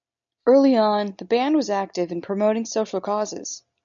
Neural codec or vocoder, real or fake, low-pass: none; real; 7.2 kHz